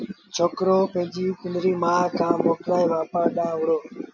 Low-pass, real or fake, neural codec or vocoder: 7.2 kHz; real; none